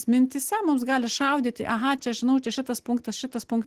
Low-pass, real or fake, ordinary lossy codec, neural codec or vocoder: 14.4 kHz; real; Opus, 16 kbps; none